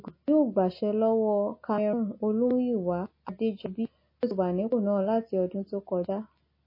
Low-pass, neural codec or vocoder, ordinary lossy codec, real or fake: 5.4 kHz; none; MP3, 24 kbps; real